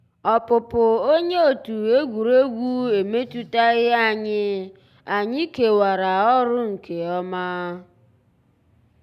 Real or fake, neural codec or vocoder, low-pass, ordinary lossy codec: real; none; 14.4 kHz; none